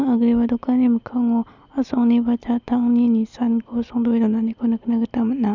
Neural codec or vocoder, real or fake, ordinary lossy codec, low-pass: none; real; none; 7.2 kHz